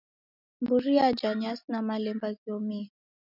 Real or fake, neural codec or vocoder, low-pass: real; none; 5.4 kHz